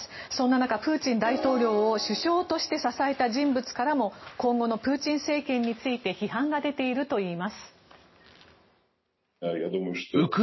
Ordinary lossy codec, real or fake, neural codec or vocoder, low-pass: MP3, 24 kbps; real; none; 7.2 kHz